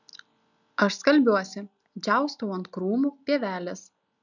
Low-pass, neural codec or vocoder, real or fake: 7.2 kHz; none; real